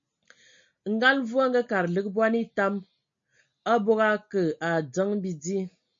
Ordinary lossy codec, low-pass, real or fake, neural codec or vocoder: MP3, 48 kbps; 7.2 kHz; real; none